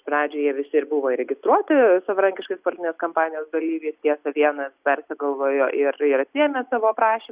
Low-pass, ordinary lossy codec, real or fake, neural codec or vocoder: 3.6 kHz; Opus, 64 kbps; real; none